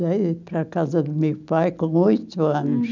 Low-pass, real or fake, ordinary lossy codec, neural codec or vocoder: 7.2 kHz; real; none; none